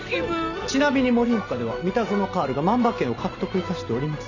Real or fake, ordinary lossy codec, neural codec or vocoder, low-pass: real; none; none; 7.2 kHz